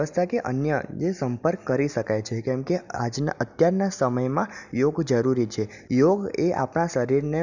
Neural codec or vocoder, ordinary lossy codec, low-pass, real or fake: none; none; 7.2 kHz; real